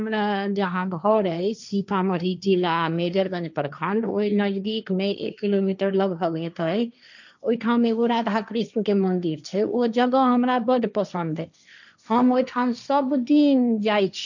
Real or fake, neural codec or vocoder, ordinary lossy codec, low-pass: fake; codec, 16 kHz, 1.1 kbps, Voila-Tokenizer; none; 7.2 kHz